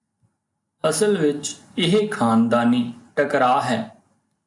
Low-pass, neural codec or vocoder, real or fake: 10.8 kHz; none; real